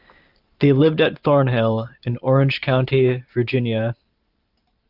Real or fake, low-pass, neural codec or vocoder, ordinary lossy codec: fake; 5.4 kHz; vocoder, 44.1 kHz, 128 mel bands every 512 samples, BigVGAN v2; Opus, 32 kbps